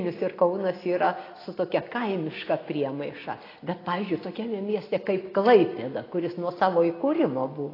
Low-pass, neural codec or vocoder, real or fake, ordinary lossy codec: 5.4 kHz; none; real; AAC, 24 kbps